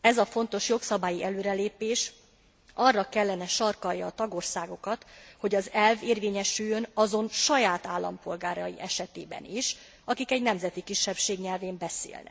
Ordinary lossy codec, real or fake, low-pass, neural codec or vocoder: none; real; none; none